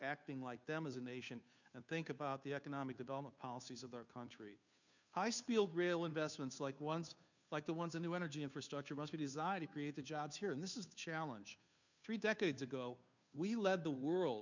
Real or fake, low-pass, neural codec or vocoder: fake; 7.2 kHz; codec, 16 kHz, 2 kbps, FunCodec, trained on Chinese and English, 25 frames a second